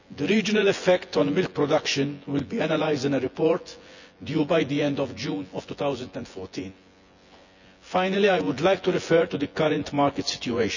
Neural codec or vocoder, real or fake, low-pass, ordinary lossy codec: vocoder, 24 kHz, 100 mel bands, Vocos; fake; 7.2 kHz; none